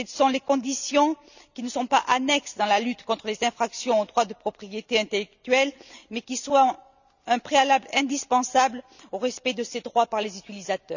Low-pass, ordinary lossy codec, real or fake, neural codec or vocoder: 7.2 kHz; none; real; none